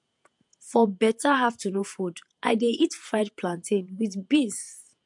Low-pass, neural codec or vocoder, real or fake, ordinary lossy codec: 10.8 kHz; vocoder, 48 kHz, 128 mel bands, Vocos; fake; MP3, 64 kbps